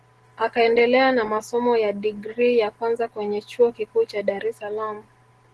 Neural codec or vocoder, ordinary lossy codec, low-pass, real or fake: none; Opus, 16 kbps; 10.8 kHz; real